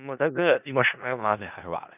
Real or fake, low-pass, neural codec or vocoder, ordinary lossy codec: fake; 3.6 kHz; codec, 16 kHz in and 24 kHz out, 0.4 kbps, LongCat-Audio-Codec, four codebook decoder; none